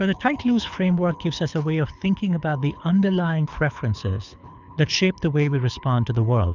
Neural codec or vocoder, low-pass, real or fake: codec, 24 kHz, 6 kbps, HILCodec; 7.2 kHz; fake